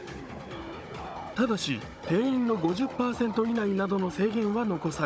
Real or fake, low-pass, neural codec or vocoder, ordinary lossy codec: fake; none; codec, 16 kHz, 16 kbps, FunCodec, trained on Chinese and English, 50 frames a second; none